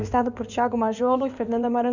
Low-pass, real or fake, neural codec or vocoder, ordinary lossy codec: 7.2 kHz; fake; codec, 16 kHz in and 24 kHz out, 2.2 kbps, FireRedTTS-2 codec; none